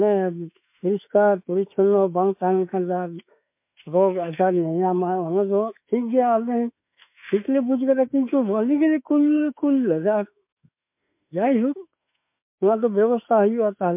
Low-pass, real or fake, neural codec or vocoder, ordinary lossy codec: 3.6 kHz; fake; autoencoder, 48 kHz, 32 numbers a frame, DAC-VAE, trained on Japanese speech; MP3, 32 kbps